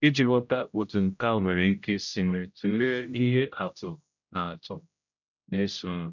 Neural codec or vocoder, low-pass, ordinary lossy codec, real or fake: codec, 16 kHz, 0.5 kbps, X-Codec, HuBERT features, trained on general audio; 7.2 kHz; none; fake